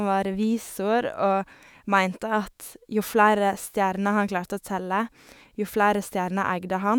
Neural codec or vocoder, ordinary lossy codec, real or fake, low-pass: autoencoder, 48 kHz, 128 numbers a frame, DAC-VAE, trained on Japanese speech; none; fake; none